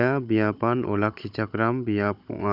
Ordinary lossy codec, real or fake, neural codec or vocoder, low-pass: none; fake; codec, 16 kHz, 16 kbps, FunCodec, trained on Chinese and English, 50 frames a second; 5.4 kHz